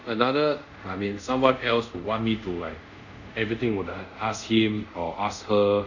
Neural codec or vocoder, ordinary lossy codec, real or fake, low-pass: codec, 24 kHz, 0.5 kbps, DualCodec; none; fake; 7.2 kHz